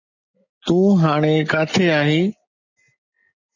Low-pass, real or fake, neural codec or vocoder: 7.2 kHz; real; none